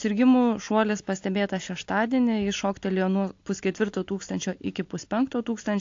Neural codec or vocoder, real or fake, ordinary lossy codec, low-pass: none; real; AAC, 48 kbps; 7.2 kHz